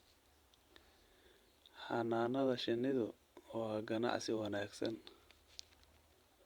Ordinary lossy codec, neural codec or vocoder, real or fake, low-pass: Opus, 64 kbps; vocoder, 44.1 kHz, 128 mel bands every 512 samples, BigVGAN v2; fake; 19.8 kHz